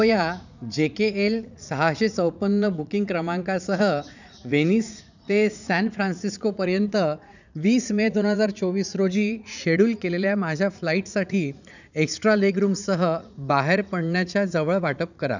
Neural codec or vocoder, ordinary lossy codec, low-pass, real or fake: vocoder, 44.1 kHz, 80 mel bands, Vocos; none; 7.2 kHz; fake